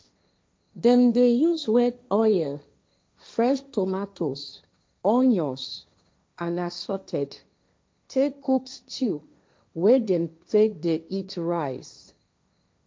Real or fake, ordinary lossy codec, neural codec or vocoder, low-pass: fake; none; codec, 16 kHz, 1.1 kbps, Voila-Tokenizer; none